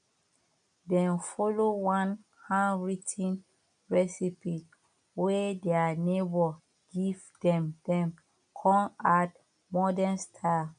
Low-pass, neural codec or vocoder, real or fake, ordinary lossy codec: 9.9 kHz; none; real; none